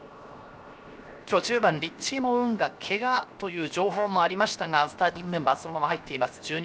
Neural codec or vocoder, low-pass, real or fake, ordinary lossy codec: codec, 16 kHz, 0.7 kbps, FocalCodec; none; fake; none